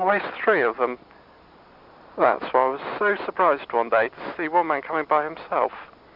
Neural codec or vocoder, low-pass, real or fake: none; 5.4 kHz; real